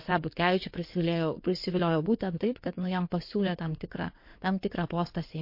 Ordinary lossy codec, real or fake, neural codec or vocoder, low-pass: MP3, 32 kbps; fake; codec, 16 kHz in and 24 kHz out, 2.2 kbps, FireRedTTS-2 codec; 5.4 kHz